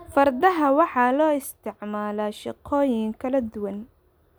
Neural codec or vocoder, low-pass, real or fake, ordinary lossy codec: none; none; real; none